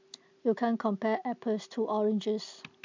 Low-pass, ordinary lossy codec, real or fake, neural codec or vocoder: 7.2 kHz; MP3, 64 kbps; real; none